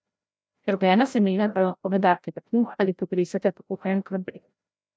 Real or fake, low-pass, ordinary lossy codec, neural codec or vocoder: fake; none; none; codec, 16 kHz, 0.5 kbps, FreqCodec, larger model